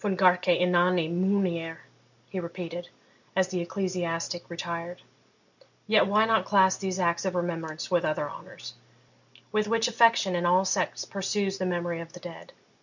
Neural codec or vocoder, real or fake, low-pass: none; real; 7.2 kHz